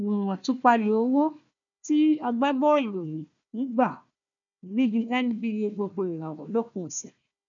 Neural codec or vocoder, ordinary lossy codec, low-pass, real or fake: codec, 16 kHz, 1 kbps, FunCodec, trained on Chinese and English, 50 frames a second; none; 7.2 kHz; fake